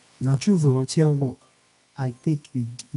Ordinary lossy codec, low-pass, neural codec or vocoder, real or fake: none; 10.8 kHz; codec, 24 kHz, 0.9 kbps, WavTokenizer, medium music audio release; fake